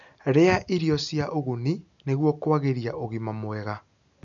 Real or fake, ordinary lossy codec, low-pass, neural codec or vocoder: real; none; 7.2 kHz; none